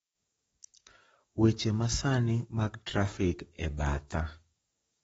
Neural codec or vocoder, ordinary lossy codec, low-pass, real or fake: codec, 44.1 kHz, 7.8 kbps, DAC; AAC, 24 kbps; 19.8 kHz; fake